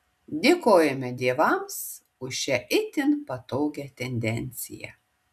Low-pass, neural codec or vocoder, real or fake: 14.4 kHz; none; real